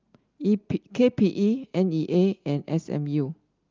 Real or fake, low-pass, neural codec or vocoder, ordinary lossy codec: real; 7.2 kHz; none; Opus, 32 kbps